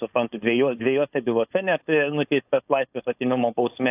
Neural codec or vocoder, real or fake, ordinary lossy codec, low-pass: codec, 16 kHz, 4.8 kbps, FACodec; fake; AAC, 32 kbps; 3.6 kHz